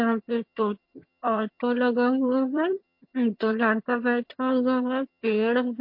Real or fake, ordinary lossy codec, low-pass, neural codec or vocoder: fake; MP3, 32 kbps; 5.4 kHz; vocoder, 22.05 kHz, 80 mel bands, HiFi-GAN